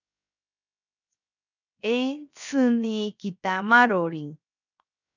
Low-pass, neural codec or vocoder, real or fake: 7.2 kHz; codec, 16 kHz, 0.7 kbps, FocalCodec; fake